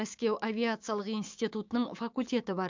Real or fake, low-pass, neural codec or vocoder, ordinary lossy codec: fake; 7.2 kHz; codec, 16 kHz, 6 kbps, DAC; MP3, 64 kbps